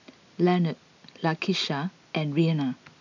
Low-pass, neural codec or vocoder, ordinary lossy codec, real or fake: 7.2 kHz; none; none; real